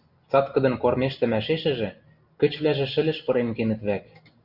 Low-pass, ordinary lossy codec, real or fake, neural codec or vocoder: 5.4 kHz; Opus, 64 kbps; real; none